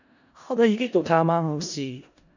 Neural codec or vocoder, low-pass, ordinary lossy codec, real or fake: codec, 16 kHz in and 24 kHz out, 0.4 kbps, LongCat-Audio-Codec, four codebook decoder; 7.2 kHz; none; fake